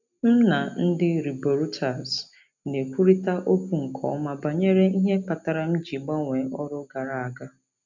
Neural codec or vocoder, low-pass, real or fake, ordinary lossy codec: none; 7.2 kHz; real; none